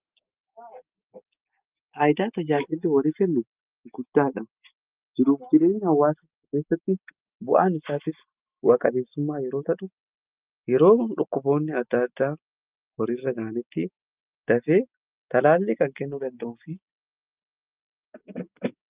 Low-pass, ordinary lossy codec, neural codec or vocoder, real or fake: 3.6 kHz; Opus, 24 kbps; none; real